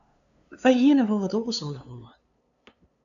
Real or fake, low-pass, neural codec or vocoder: fake; 7.2 kHz; codec, 16 kHz, 2 kbps, FunCodec, trained on LibriTTS, 25 frames a second